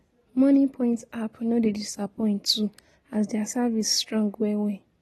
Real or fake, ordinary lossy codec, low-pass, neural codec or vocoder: real; AAC, 32 kbps; 19.8 kHz; none